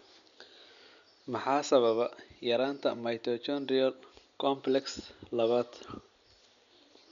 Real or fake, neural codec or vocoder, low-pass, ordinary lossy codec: real; none; 7.2 kHz; none